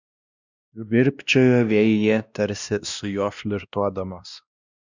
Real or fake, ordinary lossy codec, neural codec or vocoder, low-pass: fake; Opus, 64 kbps; codec, 16 kHz, 1 kbps, X-Codec, WavLM features, trained on Multilingual LibriSpeech; 7.2 kHz